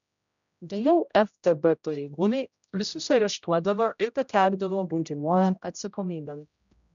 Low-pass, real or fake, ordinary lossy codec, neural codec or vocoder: 7.2 kHz; fake; MP3, 96 kbps; codec, 16 kHz, 0.5 kbps, X-Codec, HuBERT features, trained on general audio